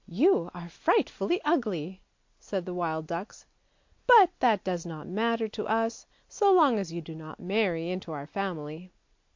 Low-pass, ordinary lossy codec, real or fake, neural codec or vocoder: 7.2 kHz; MP3, 48 kbps; real; none